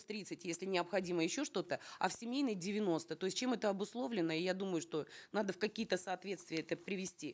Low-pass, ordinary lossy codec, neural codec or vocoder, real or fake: none; none; none; real